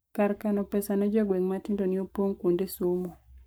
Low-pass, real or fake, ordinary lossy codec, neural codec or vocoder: none; fake; none; codec, 44.1 kHz, 7.8 kbps, Pupu-Codec